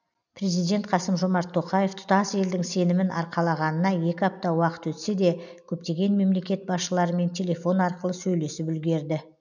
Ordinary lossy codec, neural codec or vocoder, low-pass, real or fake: none; none; 7.2 kHz; real